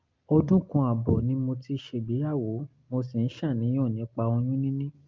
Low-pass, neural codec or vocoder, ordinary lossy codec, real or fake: 7.2 kHz; none; Opus, 32 kbps; real